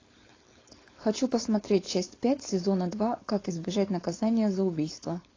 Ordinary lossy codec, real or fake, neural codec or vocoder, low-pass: AAC, 32 kbps; fake; codec, 16 kHz, 4.8 kbps, FACodec; 7.2 kHz